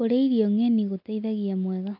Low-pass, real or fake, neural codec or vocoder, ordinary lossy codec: 5.4 kHz; real; none; MP3, 48 kbps